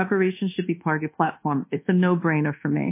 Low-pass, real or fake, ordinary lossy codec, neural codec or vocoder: 3.6 kHz; fake; MP3, 24 kbps; codec, 24 kHz, 1.2 kbps, DualCodec